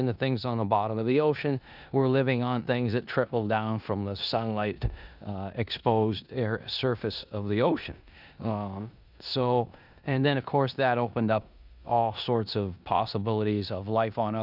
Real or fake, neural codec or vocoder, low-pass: fake; codec, 16 kHz in and 24 kHz out, 0.9 kbps, LongCat-Audio-Codec, four codebook decoder; 5.4 kHz